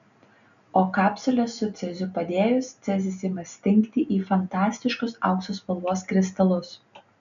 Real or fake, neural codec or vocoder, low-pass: real; none; 7.2 kHz